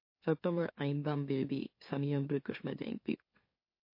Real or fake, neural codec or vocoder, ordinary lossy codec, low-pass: fake; autoencoder, 44.1 kHz, a latent of 192 numbers a frame, MeloTTS; MP3, 32 kbps; 5.4 kHz